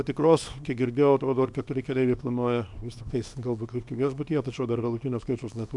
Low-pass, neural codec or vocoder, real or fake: 10.8 kHz; codec, 24 kHz, 0.9 kbps, WavTokenizer, small release; fake